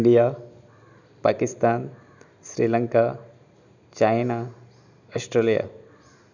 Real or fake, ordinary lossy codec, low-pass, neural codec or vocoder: real; none; 7.2 kHz; none